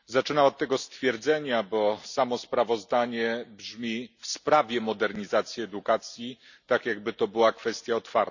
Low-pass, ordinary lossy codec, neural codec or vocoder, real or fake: 7.2 kHz; none; none; real